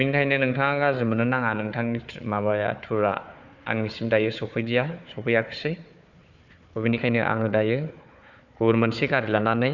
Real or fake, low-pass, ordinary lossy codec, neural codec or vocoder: fake; 7.2 kHz; none; codec, 16 kHz, 4 kbps, FunCodec, trained on Chinese and English, 50 frames a second